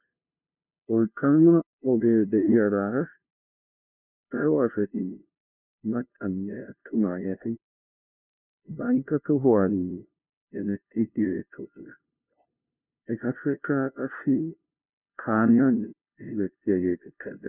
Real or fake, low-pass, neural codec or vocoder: fake; 3.6 kHz; codec, 16 kHz, 0.5 kbps, FunCodec, trained on LibriTTS, 25 frames a second